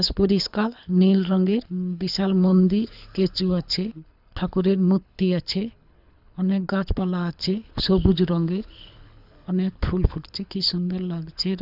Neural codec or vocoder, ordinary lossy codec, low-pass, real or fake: codec, 24 kHz, 6 kbps, HILCodec; none; 5.4 kHz; fake